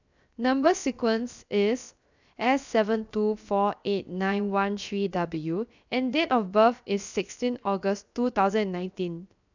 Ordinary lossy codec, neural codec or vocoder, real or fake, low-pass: none; codec, 16 kHz, 0.7 kbps, FocalCodec; fake; 7.2 kHz